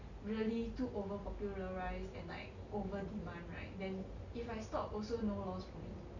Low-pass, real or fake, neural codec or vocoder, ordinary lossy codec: 7.2 kHz; real; none; none